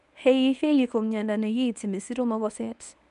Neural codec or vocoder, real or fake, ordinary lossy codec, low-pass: codec, 24 kHz, 0.9 kbps, WavTokenizer, medium speech release version 1; fake; none; 10.8 kHz